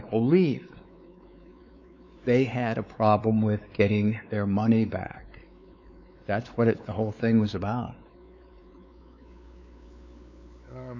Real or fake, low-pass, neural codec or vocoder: fake; 7.2 kHz; codec, 16 kHz, 4 kbps, X-Codec, WavLM features, trained on Multilingual LibriSpeech